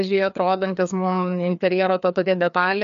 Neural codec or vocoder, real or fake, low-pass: codec, 16 kHz, 2 kbps, FreqCodec, larger model; fake; 7.2 kHz